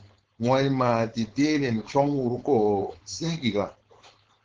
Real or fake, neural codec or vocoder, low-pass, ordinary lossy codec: fake; codec, 16 kHz, 4.8 kbps, FACodec; 7.2 kHz; Opus, 16 kbps